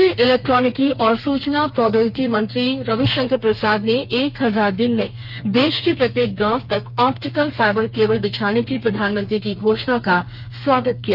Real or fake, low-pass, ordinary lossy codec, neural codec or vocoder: fake; 5.4 kHz; none; codec, 32 kHz, 1.9 kbps, SNAC